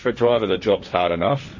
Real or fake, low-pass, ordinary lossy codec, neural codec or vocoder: fake; 7.2 kHz; MP3, 32 kbps; codec, 16 kHz, 1.1 kbps, Voila-Tokenizer